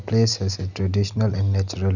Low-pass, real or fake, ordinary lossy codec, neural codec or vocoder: 7.2 kHz; real; none; none